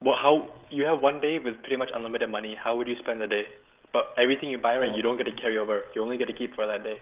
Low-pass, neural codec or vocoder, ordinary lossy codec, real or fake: 3.6 kHz; codec, 16 kHz, 16 kbps, FreqCodec, smaller model; Opus, 24 kbps; fake